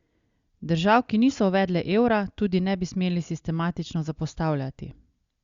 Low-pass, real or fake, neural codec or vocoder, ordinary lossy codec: 7.2 kHz; real; none; Opus, 64 kbps